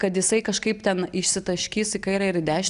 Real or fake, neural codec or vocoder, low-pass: real; none; 10.8 kHz